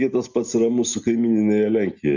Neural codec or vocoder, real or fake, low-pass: none; real; 7.2 kHz